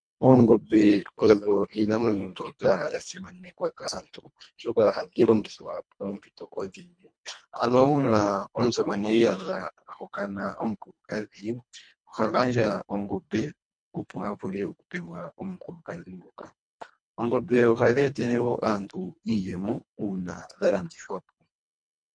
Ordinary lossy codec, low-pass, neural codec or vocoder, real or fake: MP3, 64 kbps; 9.9 kHz; codec, 24 kHz, 1.5 kbps, HILCodec; fake